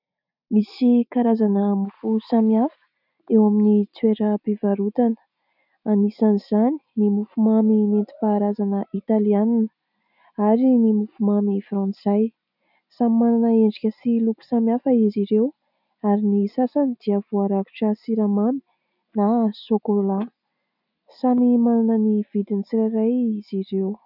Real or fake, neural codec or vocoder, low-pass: real; none; 5.4 kHz